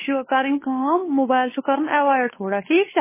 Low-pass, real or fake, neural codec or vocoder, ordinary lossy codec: 3.6 kHz; fake; codec, 16 kHz, 2 kbps, FunCodec, trained on Chinese and English, 25 frames a second; MP3, 16 kbps